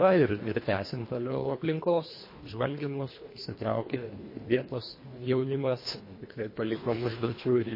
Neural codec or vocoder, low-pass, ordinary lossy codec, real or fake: codec, 24 kHz, 1.5 kbps, HILCodec; 5.4 kHz; MP3, 24 kbps; fake